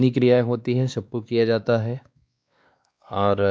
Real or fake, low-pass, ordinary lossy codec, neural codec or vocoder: fake; none; none; codec, 16 kHz, 2 kbps, X-Codec, WavLM features, trained on Multilingual LibriSpeech